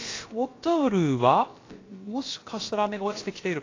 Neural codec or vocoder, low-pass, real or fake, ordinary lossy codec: codec, 16 kHz, about 1 kbps, DyCAST, with the encoder's durations; 7.2 kHz; fake; AAC, 32 kbps